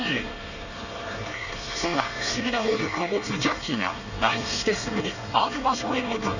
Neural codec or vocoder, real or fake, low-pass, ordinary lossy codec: codec, 24 kHz, 1 kbps, SNAC; fake; 7.2 kHz; none